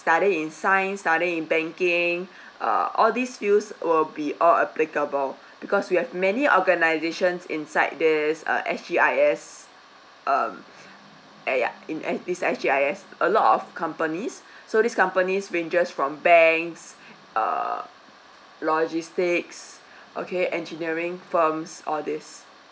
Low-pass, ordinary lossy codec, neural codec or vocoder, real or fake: none; none; none; real